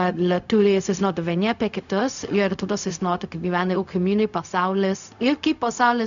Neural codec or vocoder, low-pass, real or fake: codec, 16 kHz, 0.4 kbps, LongCat-Audio-Codec; 7.2 kHz; fake